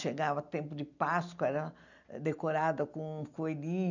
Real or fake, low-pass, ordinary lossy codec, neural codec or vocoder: real; 7.2 kHz; none; none